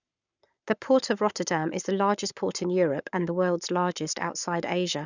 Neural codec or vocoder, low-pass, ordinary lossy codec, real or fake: codec, 44.1 kHz, 7.8 kbps, Pupu-Codec; 7.2 kHz; none; fake